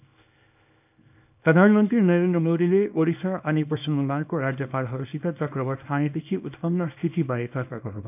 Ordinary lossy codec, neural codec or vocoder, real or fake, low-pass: none; codec, 24 kHz, 0.9 kbps, WavTokenizer, small release; fake; 3.6 kHz